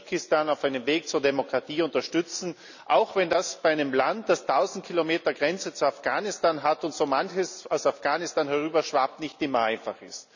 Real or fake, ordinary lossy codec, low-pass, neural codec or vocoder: real; none; 7.2 kHz; none